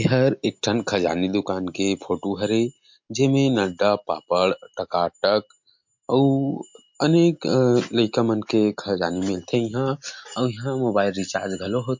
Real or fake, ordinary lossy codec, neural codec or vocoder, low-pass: real; MP3, 48 kbps; none; 7.2 kHz